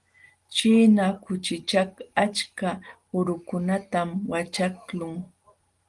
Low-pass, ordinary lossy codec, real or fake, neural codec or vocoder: 10.8 kHz; Opus, 24 kbps; real; none